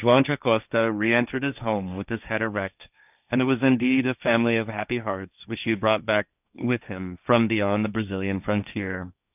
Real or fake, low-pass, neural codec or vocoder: fake; 3.6 kHz; codec, 16 kHz, 1.1 kbps, Voila-Tokenizer